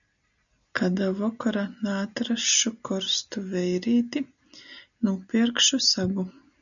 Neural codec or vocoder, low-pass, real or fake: none; 7.2 kHz; real